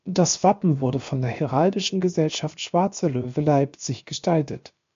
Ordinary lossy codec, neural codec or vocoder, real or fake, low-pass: MP3, 48 kbps; codec, 16 kHz, about 1 kbps, DyCAST, with the encoder's durations; fake; 7.2 kHz